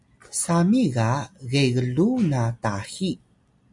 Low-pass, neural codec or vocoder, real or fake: 10.8 kHz; none; real